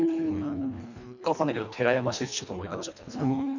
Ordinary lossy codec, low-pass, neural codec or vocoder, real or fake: none; 7.2 kHz; codec, 24 kHz, 1.5 kbps, HILCodec; fake